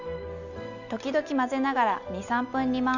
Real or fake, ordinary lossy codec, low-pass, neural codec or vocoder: real; none; 7.2 kHz; none